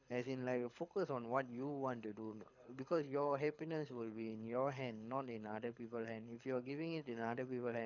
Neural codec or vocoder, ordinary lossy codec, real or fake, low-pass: codec, 24 kHz, 6 kbps, HILCodec; none; fake; 7.2 kHz